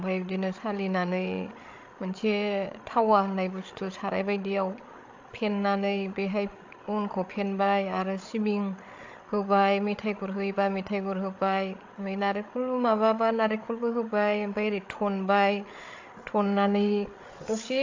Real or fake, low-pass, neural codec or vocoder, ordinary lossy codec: fake; 7.2 kHz; codec, 16 kHz, 8 kbps, FreqCodec, larger model; AAC, 48 kbps